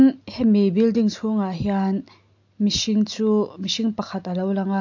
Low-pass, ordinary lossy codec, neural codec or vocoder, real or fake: 7.2 kHz; AAC, 48 kbps; none; real